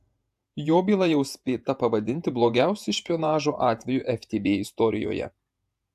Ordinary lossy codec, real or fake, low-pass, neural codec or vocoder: AAC, 96 kbps; fake; 14.4 kHz; vocoder, 48 kHz, 128 mel bands, Vocos